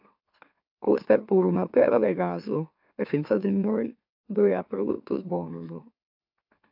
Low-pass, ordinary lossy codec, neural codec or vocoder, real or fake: 5.4 kHz; AAC, 48 kbps; autoencoder, 44.1 kHz, a latent of 192 numbers a frame, MeloTTS; fake